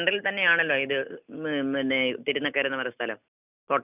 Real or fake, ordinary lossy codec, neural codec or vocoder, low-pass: real; none; none; 3.6 kHz